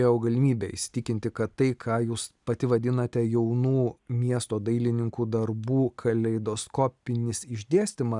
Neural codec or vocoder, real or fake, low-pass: none; real; 10.8 kHz